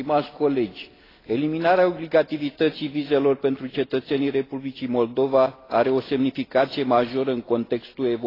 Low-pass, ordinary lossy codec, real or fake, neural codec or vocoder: 5.4 kHz; AAC, 24 kbps; real; none